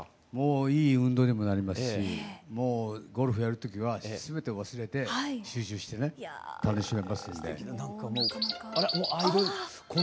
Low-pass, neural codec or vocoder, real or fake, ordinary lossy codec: none; none; real; none